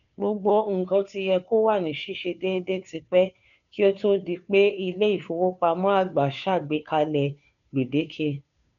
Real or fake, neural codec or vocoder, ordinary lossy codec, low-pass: fake; codec, 16 kHz, 2 kbps, FunCodec, trained on Chinese and English, 25 frames a second; none; 7.2 kHz